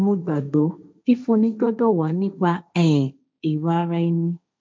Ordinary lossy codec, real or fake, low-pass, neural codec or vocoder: none; fake; none; codec, 16 kHz, 1.1 kbps, Voila-Tokenizer